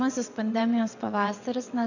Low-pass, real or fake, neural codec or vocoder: 7.2 kHz; fake; vocoder, 22.05 kHz, 80 mel bands, WaveNeXt